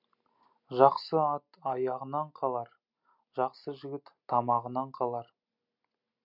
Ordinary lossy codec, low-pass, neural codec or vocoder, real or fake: MP3, 48 kbps; 5.4 kHz; none; real